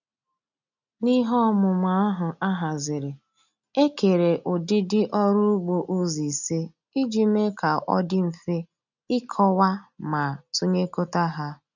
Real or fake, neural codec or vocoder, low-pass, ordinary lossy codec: real; none; 7.2 kHz; none